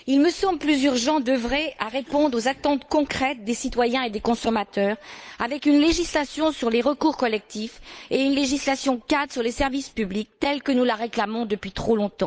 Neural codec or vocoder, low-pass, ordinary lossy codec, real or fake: codec, 16 kHz, 8 kbps, FunCodec, trained on Chinese and English, 25 frames a second; none; none; fake